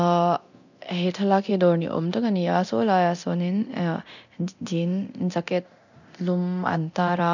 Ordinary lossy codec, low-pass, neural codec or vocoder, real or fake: none; 7.2 kHz; codec, 24 kHz, 0.9 kbps, DualCodec; fake